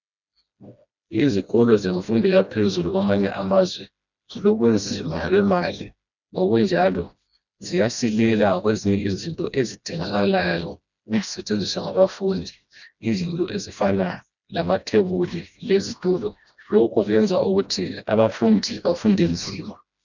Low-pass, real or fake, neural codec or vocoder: 7.2 kHz; fake; codec, 16 kHz, 1 kbps, FreqCodec, smaller model